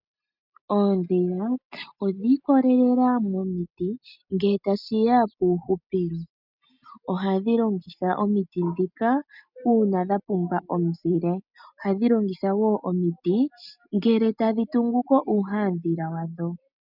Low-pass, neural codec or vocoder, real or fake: 5.4 kHz; none; real